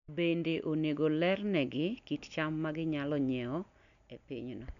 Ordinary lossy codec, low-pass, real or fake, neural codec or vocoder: none; 7.2 kHz; real; none